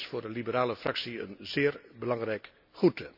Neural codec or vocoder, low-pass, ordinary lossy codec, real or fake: none; 5.4 kHz; none; real